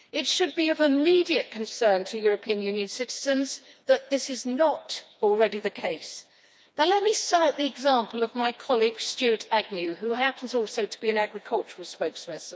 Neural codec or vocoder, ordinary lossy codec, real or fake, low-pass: codec, 16 kHz, 2 kbps, FreqCodec, smaller model; none; fake; none